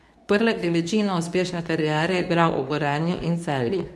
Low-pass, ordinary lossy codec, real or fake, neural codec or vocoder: none; none; fake; codec, 24 kHz, 0.9 kbps, WavTokenizer, medium speech release version 2